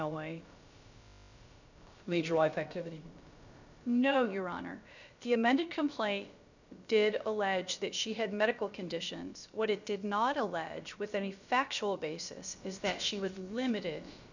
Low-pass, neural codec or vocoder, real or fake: 7.2 kHz; codec, 16 kHz, about 1 kbps, DyCAST, with the encoder's durations; fake